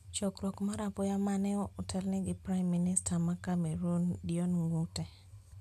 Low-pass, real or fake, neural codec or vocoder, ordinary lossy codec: 14.4 kHz; real; none; none